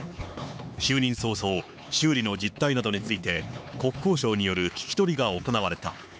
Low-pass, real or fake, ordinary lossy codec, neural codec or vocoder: none; fake; none; codec, 16 kHz, 4 kbps, X-Codec, HuBERT features, trained on LibriSpeech